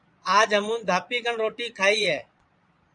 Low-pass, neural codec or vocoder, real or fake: 9.9 kHz; vocoder, 22.05 kHz, 80 mel bands, Vocos; fake